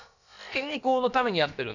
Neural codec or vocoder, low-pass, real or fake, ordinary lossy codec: codec, 16 kHz, about 1 kbps, DyCAST, with the encoder's durations; 7.2 kHz; fake; none